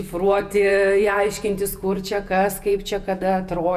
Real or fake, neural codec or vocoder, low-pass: fake; vocoder, 48 kHz, 128 mel bands, Vocos; 14.4 kHz